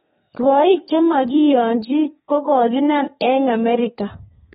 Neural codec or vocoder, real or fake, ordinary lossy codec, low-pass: codec, 32 kHz, 1.9 kbps, SNAC; fake; AAC, 16 kbps; 14.4 kHz